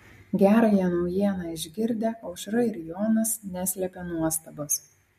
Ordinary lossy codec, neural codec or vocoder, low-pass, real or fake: MP3, 64 kbps; none; 14.4 kHz; real